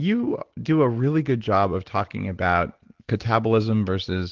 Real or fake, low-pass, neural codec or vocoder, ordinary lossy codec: real; 7.2 kHz; none; Opus, 16 kbps